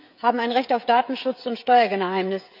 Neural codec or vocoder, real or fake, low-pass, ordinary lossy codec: codec, 16 kHz, 16 kbps, FreqCodec, smaller model; fake; 5.4 kHz; none